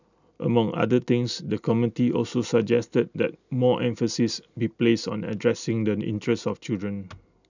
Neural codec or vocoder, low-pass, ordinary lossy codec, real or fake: none; 7.2 kHz; none; real